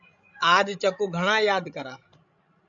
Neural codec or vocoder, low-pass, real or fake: codec, 16 kHz, 16 kbps, FreqCodec, larger model; 7.2 kHz; fake